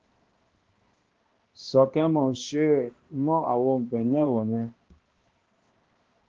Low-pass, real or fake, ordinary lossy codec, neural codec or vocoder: 7.2 kHz; fake; Opus, 16 kbps; codec, 16 kHz, 1 kbps, X-Codec, HuBERT features, trained on balanced general audio